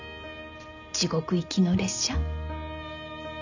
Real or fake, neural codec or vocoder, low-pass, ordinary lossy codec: real; none; 7.2 kHz; none